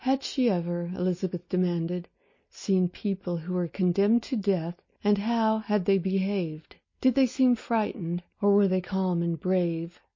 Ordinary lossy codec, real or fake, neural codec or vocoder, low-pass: MP3, 32 kbps; real; none; 7.2 kHz